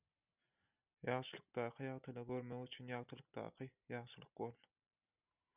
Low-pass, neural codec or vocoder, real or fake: 3.6 kHz; none; real